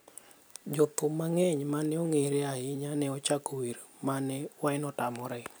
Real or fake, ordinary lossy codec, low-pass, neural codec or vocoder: real; none; none; none